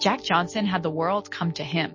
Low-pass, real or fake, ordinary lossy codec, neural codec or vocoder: 7.2 kHz; real; MP3, 32 kbps; none